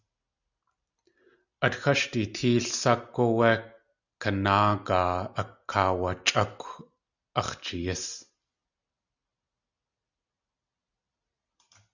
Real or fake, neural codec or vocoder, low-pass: real; none; 7.2 kHz